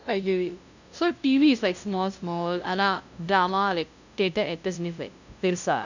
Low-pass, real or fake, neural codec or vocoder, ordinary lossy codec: 7.2 kHz; fake; codec, 16 kHz, 0.5 kbps, FunCodec, trained on LibriTTS, 25 frames a second; none